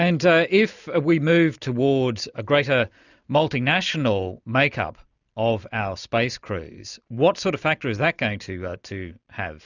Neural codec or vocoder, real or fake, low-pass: none; real; 7.2 kHz